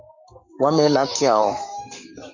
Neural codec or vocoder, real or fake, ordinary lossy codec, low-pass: codec, 44.1 kHz, 7.8 kbps, Pupu-Codec; fake; Opus, 64 kbps; 7.2 kHz